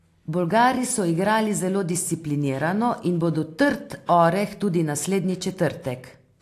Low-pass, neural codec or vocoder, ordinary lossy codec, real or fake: 14.4 kHz; none; AAC, 64 kbps; real